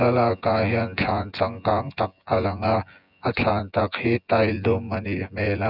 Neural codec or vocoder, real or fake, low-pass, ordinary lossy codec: vocoder, 24 kHz, 100 mel bands, Vocos; fake; 5.4 kHz; none